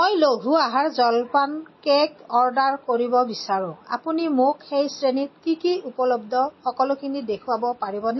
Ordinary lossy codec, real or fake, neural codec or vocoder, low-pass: MP3, 24 kbps; real; none; 7.2 kHz